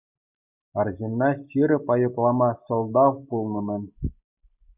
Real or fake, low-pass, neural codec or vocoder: real; 3.6 kHz; none